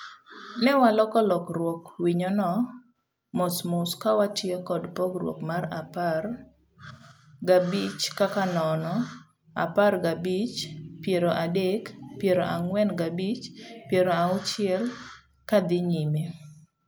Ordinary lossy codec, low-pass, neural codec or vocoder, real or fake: none; none; none; real